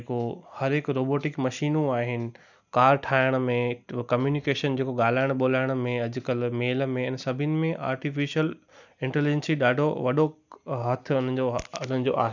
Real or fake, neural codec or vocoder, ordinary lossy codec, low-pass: real; none; none; 7.2 kHz